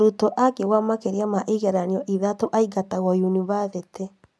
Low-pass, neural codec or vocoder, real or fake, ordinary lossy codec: none; none; real; none